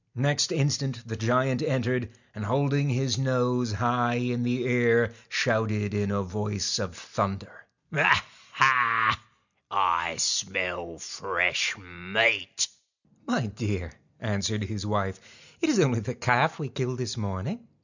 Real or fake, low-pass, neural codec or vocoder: real; 7.2 kHz; none